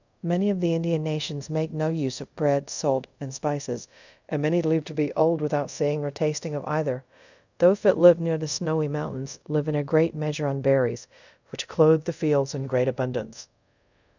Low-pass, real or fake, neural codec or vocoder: 7.2 kHz; fake; codec, 24 kHz, 0.5 kbps, DualCodec